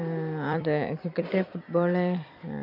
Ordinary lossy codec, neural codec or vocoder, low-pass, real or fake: none; vocoder, 44.1 kHz, 128 mel bands every 256 samples, BigVGAN v2; 5.4 kHz; fake